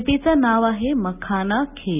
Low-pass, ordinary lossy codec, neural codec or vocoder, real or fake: 3.6 kHz; none; none; real